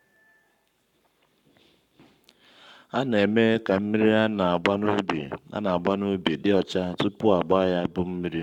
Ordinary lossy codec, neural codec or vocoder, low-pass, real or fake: none; codec, 44.1 kHz, 7.8 kbps, Pupu-Codec; 19.8 kHz; fake